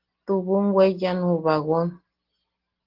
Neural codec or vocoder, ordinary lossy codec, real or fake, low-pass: none; Opus, 16 kbps; real; 5.4 kHz